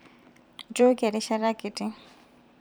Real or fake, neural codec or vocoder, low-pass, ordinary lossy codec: fake; vocoder, 44.1 kHz, 128 mel bands every 512 samples, BigVGAN v2; none; none